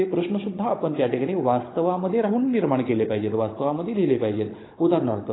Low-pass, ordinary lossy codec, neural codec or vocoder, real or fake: 7.2 kHz; AAC, 16 kbps; codec, 16 kHz, 16 kbps, FunCodec, trained on Chinese and English, 50 frames a second; fake